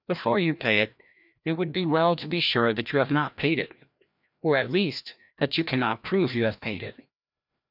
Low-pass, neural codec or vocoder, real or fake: 5.4 kHz; codec, 16 kHz, 1 kbps, FreqCodec, larger model; fake